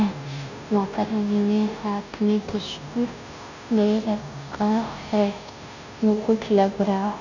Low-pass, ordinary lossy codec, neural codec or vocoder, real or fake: 7.2 kHz; none; codec, 16 kHz, 0.5 kbps, FunCodec, trained on Chinese and English, 25 frames a second; fake